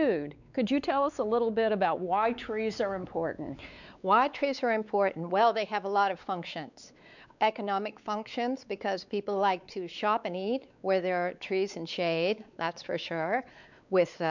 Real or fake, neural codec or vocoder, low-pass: fake; codec, 16 kHz, 4 kbps, X-Codec, WavLM features, trained on Multilingual LibriSpeech; 7.2 kHz